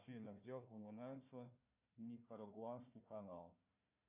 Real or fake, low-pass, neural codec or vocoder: fake; 3.6 kHz; codec, 16 kHz, 1 kbps, FunCodec, trained on Chinese and English, 50 frames a second